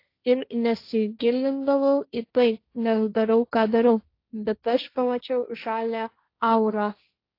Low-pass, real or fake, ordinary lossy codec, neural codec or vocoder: 5.4 kHz; fake; AAC, 32 kbps; codec, 16 kHz, 1.1 kbps, Voila-Tokenizer